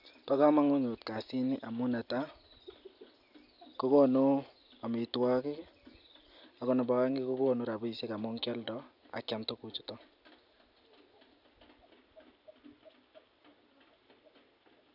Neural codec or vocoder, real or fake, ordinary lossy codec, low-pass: none; real; none; 5.4 kHz